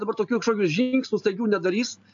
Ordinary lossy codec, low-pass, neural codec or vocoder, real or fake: AAC, 64 kbps; 7.2 kHz; none; real